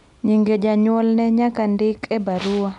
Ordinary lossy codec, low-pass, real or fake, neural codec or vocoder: none; 10.8 kHz; real; none